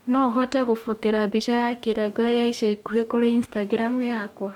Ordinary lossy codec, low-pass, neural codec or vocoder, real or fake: MP3, 96 kbps; 19.8 kHz; codec, 44.1 kHz, 2.6 kbps, DAC; fake